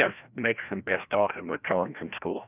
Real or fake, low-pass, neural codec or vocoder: fake; 3.6 kHz; codec, 16 kHz, 1 kbps, FreqCodec, larger model